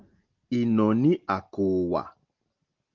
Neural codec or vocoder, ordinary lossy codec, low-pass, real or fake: none; Opus, 32 kbps; 7.2 kHz; real